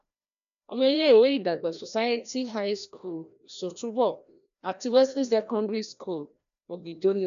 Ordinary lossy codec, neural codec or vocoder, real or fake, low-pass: none; codec, 16 kHz, 1 kbps, FreqCodec, larger model; fake; 7.2 kHz